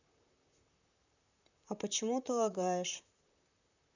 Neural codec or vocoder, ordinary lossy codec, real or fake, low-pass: vocoder, 44.1 kHz, 128 mel bands, Pupu-Vocoder; none; fake; 7.2 kHz